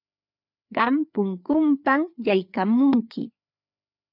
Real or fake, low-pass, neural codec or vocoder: fake; 5.4 kHz; codec, 16 kHz, 4 kbps, FreqCodec, larger model